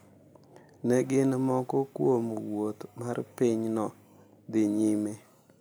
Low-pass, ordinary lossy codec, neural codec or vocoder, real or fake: none; none; none; real